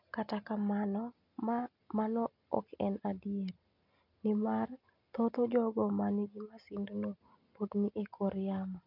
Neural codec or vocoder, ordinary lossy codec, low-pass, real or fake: none; none; 5.4 kHz; real